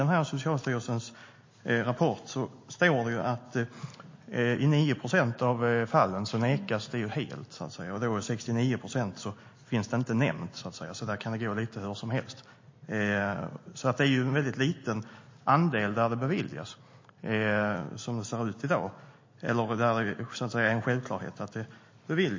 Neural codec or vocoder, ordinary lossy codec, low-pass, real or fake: none; MP3, 32 kbps; 7.2 kHz; real